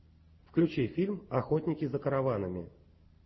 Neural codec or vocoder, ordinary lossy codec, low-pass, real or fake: none; MP3, 24 kbps; 7.2 kHz; real